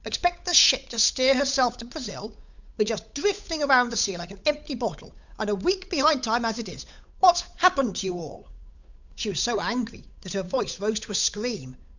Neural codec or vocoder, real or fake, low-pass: codec, 16 kHz, 8 kbps, FunCodec, trained on Chinese and English, 25 frames a second; fake; 7.2 kHz